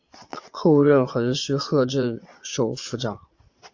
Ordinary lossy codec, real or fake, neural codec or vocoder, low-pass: AAC, 48 kbps; fake; vocoder, 22.05 kHz, 80 mel bands, Vocos; 7.2 kHz